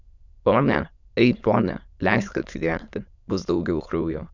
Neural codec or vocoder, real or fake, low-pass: autoencoder, 22.05 kHz, a latent of 192 numbers a frame, VITS, trained on many speakers; fake; 7.2 kHz